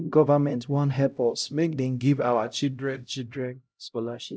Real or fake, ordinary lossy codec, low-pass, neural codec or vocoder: fake; none; none; codec, 16 kHz, 0.5 kbps, X-Codec, HuBERT features, trained on LibriSpeech